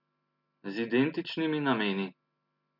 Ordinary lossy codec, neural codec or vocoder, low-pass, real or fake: none; none; 5.4 kHz; real